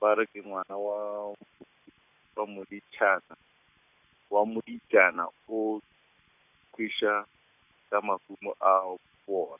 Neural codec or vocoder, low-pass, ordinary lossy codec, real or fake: none; 3.6 kHz; none; real